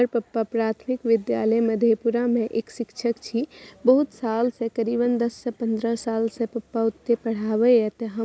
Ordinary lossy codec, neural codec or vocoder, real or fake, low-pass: none; none; real; none